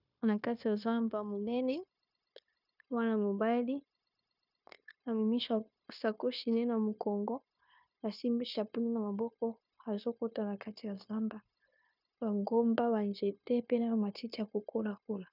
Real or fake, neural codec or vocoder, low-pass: fake; codec, 16 kHz, 0.9 kbps, LongCat-Audio-Codec; 5.4 kHz